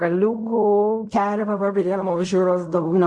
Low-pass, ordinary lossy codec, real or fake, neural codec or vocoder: 10.8 kHz; MP3, 48 kbps; fake; codec, 16 kHz in and 24 kHz out, 0.4 kbps, LongCat-Audio-Codec, fine tuned four codebook decoder